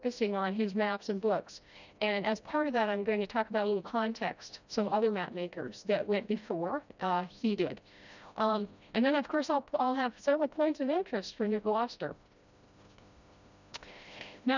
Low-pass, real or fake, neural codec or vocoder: 7.2 kHz; fake; codec, 16 kHz, 1 kbps, FreqCodec, smaller model